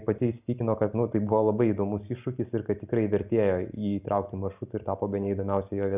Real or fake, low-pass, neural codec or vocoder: real; 3.6 kHz; none